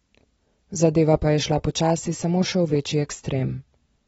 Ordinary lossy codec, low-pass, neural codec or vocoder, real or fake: AAC, 24 kbps; 19.8 kHz; none; real